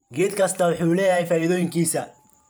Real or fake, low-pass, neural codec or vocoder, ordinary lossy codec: fake; none; vocoder, 44.1 kHz, 128 mel bands every 512 samples, BigVGAN v2; none